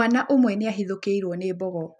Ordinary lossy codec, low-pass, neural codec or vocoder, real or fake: none; none; none; real